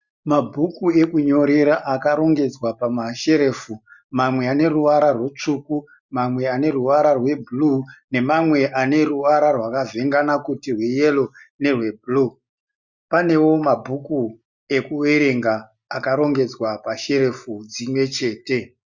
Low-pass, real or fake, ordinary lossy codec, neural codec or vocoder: 7.2 kHz; real; Opus, 64 kbps; none